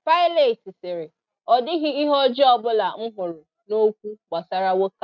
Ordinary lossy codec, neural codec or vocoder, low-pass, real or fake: none; none; 7.2 kHz; real